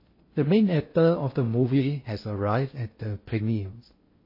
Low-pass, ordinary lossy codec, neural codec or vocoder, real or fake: 5.4 kHz; MP3, 24 kbps; codec, 16 kHz in and 24 kHz out, 0.6 kbps, FocalCodec, streaming, 2048 codes; fake